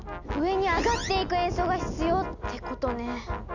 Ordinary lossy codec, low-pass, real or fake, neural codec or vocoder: none; 7.2 kHz; real; none